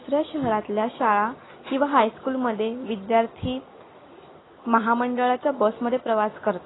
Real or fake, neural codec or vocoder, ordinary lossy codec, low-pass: real; none; AAC, 16 kbps; 7.2 kHz